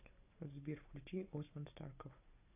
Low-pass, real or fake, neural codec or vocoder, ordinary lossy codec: 3.6 kHz; real; none; AAC, 32 kbps